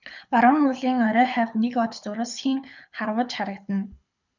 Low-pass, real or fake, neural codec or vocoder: 7.2 kHz; fake; codec, 24 kHz, 6 kbps, HILCodec